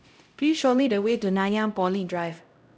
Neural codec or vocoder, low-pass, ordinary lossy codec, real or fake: codec, 16 kHz, 0.5 kbps, X-Codec, HuBERT features, trained on LibriSpeech; none; none; fake